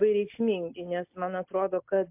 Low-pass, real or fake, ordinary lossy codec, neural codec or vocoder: 3.6 kHz; real; MP3, 32 kbps; none